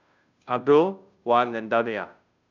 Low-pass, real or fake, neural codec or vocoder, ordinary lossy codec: 7.2 kHz; fake; codec, 16 kHz, 0.5 kbps, FunCodec, trained on Chinese and English, 25 frames a second; none